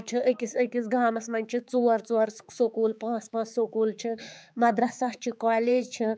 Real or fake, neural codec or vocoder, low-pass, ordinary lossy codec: fake; codec, 16 kHz, 4 kbps, X-Codec, HuBERT features, trained on balanced general audio; none; none